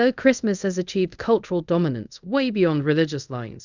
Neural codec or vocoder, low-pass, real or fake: codec, 24 kHz, 0.5 kbps, DualCodec; 7.2 kHz; fake